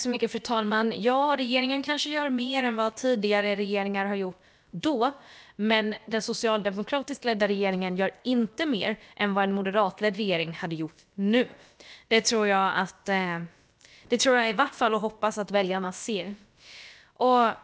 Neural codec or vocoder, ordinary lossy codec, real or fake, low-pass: codec, 16 kHz, about 1 kbps, DyCAST, with the encoder's durations; none; fake; none